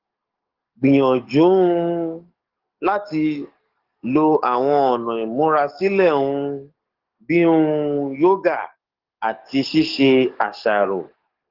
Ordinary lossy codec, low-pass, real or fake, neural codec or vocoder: Opus, 16 kbps; 5.4 kHz; fake; codec, 44.1 kHz, 7.8 kbps, DAC